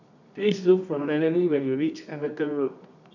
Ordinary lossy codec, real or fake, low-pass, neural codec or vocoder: none; fake; 7.2 kHz; codec, 24 kHz, 0.9 kbps, WavTokenizer, medium music audio release